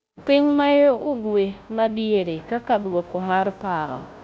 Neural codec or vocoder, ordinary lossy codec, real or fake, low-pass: codec, 16 kHz, 0.5 kbps, FunCodec, trained on Chinese and English, 25 frames a second; none; fake; none